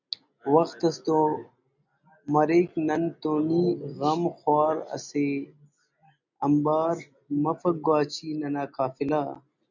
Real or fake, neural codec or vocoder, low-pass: real; none; 7.2 kHz